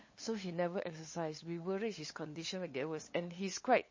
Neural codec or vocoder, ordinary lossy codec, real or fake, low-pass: codec, 16 kHz, 8 kbps, FunCodec, trained on LibriTTS, 25 frames a second; MP3, 32 kbps; fake; 7.2 kHz